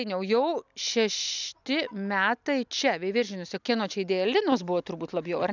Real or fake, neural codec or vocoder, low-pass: real; none; 7.2 kHz